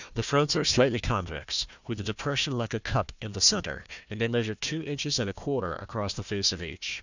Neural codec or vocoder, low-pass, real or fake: codec, 16 kHz, 1 kbps, FunCodec, trained on Chinese and English, 50 frames a second; 7.2 kHz; fake